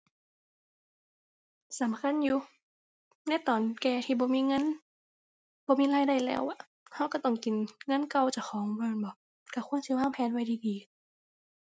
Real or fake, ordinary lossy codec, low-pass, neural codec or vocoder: real; none; none; none